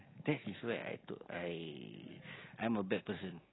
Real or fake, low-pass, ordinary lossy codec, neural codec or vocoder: real; 7.2 kHz; AAC, 16 kbps; none